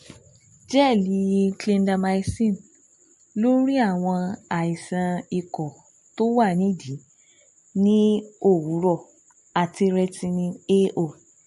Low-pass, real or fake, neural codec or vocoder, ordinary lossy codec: 14.4 kHz; real; none; MP3, 48 kbps